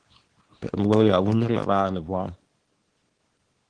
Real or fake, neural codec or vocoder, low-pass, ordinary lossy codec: fake; codec, 24 kHz, 0.9 kbps, WavTokenizer, small release; 9.9 kHz; Opus, 16 kbps